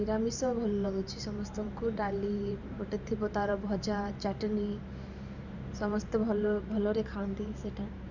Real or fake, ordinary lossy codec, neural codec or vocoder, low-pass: fake; none; vocoder, 44.1 kHz, 128 mel bands every 512 samples, BigVGAN v2; 7.2 kHz